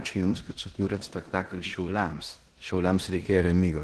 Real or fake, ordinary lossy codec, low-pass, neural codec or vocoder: fake; Opus, 16 kbps; 10.8 kHz; codec, 16 kHz in and 24 kHz out, 0.9 kbps, LongCat-Audio-Codec, four codebook decoder